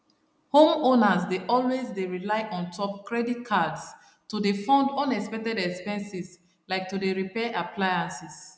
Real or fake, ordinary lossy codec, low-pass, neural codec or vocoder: real; none; none; none